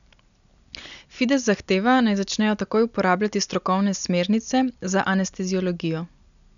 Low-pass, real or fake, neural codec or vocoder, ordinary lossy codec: 7.2 kHz; real; none; MP3, 96 kbps